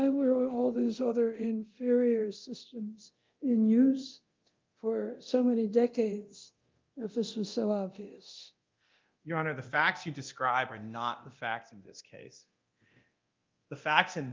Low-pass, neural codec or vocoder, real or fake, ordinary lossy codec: 7.2 kHz; codec, 24 kHz, 0.9 kbps, DualCodec; fake; Opus, 32 kbps